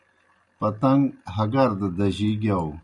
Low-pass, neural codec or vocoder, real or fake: 10.8 kHz; none; real